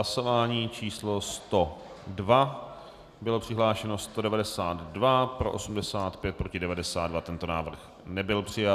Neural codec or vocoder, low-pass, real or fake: none; 14.4 kHz; real